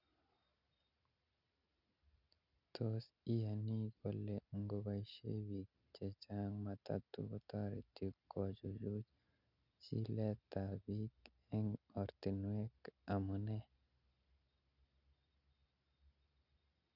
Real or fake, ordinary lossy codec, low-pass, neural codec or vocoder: real; none; 5.4 kHz; none